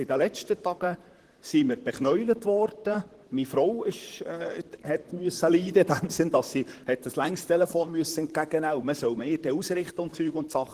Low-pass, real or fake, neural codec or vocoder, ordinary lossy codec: 14.4 kHz; fake; vocoder, 44.1 kHz, 128 mel bands, Pupu-Vocoder; Opus, 16 kbps